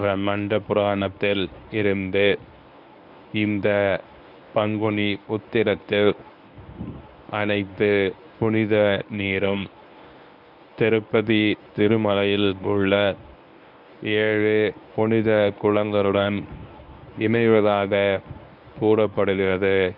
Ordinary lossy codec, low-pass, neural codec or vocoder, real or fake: none; 5.4 kHz; codec, 24 kHz, 0.9 kbps, WavTokenizer, medium speech release version 1; fake